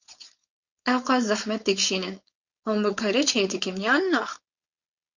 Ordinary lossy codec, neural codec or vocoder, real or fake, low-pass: Opus, 64 kbps; codec, 16 kHz, 4.8 kbps, FACodec; fake; 7.2 kHz